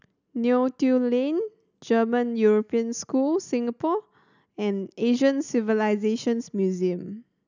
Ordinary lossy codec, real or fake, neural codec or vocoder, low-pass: none; real; none; 7.2 kHz